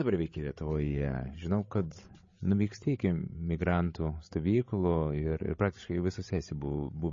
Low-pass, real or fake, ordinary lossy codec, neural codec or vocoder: 7.2 kHz; fake; MP3, 32 kbps; codec, 16 kHz, 16 kbps, FreqCodec, larger model